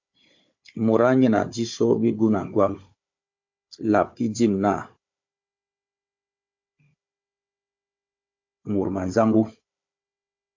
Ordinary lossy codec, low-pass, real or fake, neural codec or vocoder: MP3, 48 kbps; 7.2 kHz; fake; codec, 16 kHz, 4 kbps, FunCodec, trained on Chinese and English, 50 frames a second